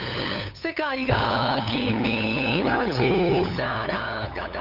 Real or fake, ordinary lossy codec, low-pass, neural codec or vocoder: fake; none; 5.4 kHz; codec, 16 kHz, 8 kbps, FunCodec, trained on LibriTTS, 25 frames a second